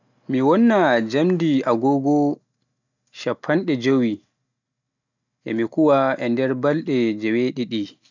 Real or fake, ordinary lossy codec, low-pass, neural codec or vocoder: real; none; 7.2 kHz; none